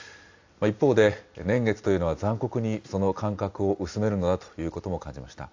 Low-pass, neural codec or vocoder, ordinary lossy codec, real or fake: 7.2 kHz; none; AAC, 48 kbps; real